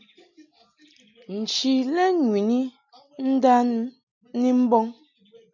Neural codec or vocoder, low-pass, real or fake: none; 7.2 kHz; real